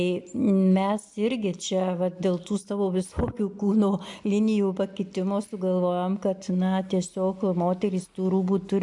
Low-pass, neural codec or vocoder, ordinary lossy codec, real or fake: 10.8 kHz; none; MP3, 64 kbps; real